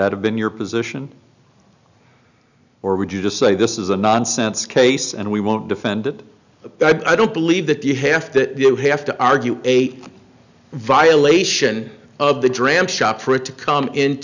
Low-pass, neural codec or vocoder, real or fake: 7.2 kHz; none; real